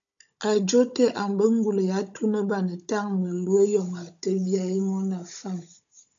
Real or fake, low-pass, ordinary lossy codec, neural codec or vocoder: fake; 7.2 kHz; MP3, 64 kbps; codec, 16 kHz, 16 kbps, FunCodec, trained on Chinese and English, 50 frames a second